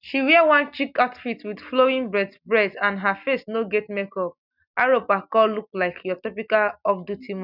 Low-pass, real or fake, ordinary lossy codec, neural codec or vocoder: 5.4 kHz; real; none; none